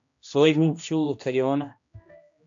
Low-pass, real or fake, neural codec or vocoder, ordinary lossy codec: 7.2 kHz; fake; codec, 16 kHz, 1 kbps, X-Codec, HuBERT features, trained on general audio; AAC, 64 kbps